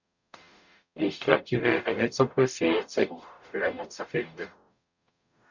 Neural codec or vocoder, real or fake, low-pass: codec, 44.1 kHz, 0.9 kbps, DAC; fake; 7.2 kHz